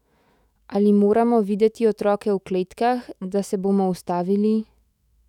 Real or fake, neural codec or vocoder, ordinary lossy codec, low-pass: fake; autoencoder, 48 kHz, 128 numbers a frame, DAC-VAE, trained on Japanese speech; none; 19.8 kHz